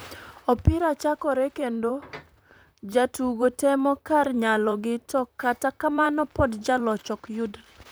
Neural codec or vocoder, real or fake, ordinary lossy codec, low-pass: vocoder, 44.1 kHz, 128 mel bands, Pupu-Vocoder; fake; none; none